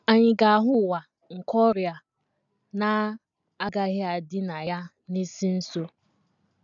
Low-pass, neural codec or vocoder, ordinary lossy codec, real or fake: 7.2 kHz; none; none; real